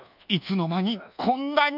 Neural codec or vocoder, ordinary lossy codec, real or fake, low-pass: codec, 24 kHz, 1.2 kbps, DualCodec; none; fake; 5.4 kHz